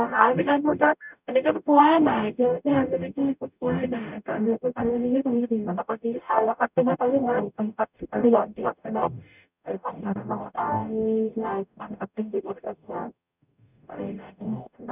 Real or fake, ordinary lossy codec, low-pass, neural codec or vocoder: fake; none; 3.6 kHz; codec, 44.1 kHz, 0.9 kbps, DAC